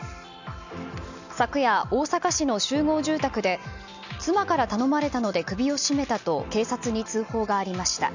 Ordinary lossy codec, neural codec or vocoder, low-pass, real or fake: none; none; 7.2 kHz; real